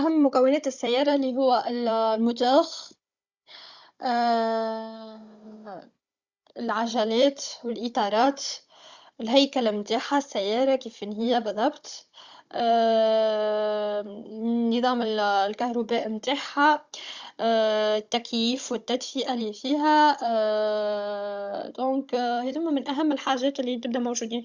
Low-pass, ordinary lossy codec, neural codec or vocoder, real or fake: 7.2 kHz; Opus, 64 kbps; codec, 16 kHz, 16 kbps, FunCodec, trained on Chinese and English, 50 frames a second; fake